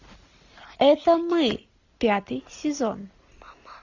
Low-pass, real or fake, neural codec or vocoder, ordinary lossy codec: 7.2 kHz; real; none; AAC, 48 kbps